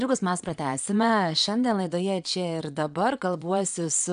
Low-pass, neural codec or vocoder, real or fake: 9.9 kHz; vocoder, 22.05 kHz, 80 mel bands, Vocos; fake